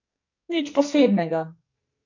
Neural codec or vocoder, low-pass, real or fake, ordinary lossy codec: codec, 44.1 kHz, 2.6 kbps, SNAC; 7.2 kHz; fake; AAC, 48 kbps